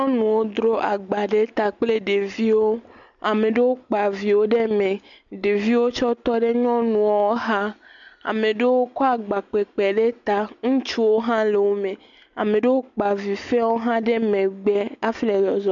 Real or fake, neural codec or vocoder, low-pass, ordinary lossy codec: real; none; 7.2 kHz; MP3, 64 kbps